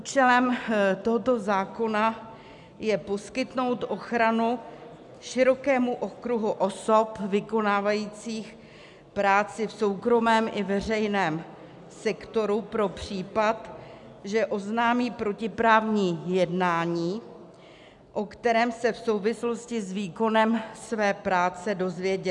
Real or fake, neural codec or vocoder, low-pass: real; none; 10.8 kHz